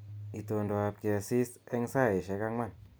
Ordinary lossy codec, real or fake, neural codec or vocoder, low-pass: none; real; none; none